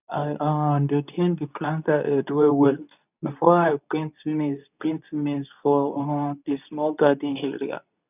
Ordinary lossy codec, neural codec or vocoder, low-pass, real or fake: none; codec, 24 kHz, 0.9 kbps, WavTokenizer, medium speech release version 2; 3.6 kHz; fake